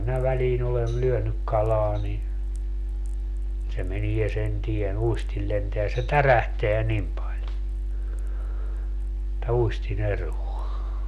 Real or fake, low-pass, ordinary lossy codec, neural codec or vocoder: real; 14.4 kHz; none; none